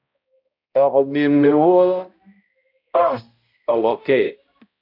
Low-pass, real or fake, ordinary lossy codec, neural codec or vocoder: 5.4 kHz; fake; AAC, 48 kbps; codec, 16 kHz, 0.5 kbps, X-Codec, HuBERT features, trained on balanced general audio